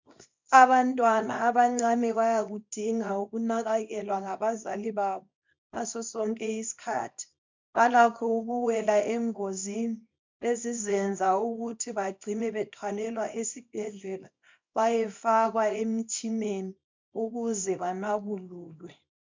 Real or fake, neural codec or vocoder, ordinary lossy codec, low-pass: fake; codec, 24 kHz, 0.9 kbps, WavTokenizer, small release; MP3, 64 kbps; 7.2 kHz